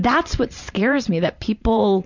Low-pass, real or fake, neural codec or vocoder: 7.2 kHz; fake; vocoder, 44.1 kHz, 128 mel bands every 512 samples, BigVGAN v2